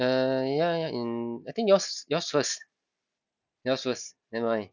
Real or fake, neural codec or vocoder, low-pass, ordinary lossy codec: real; none; 7.2 kHz; none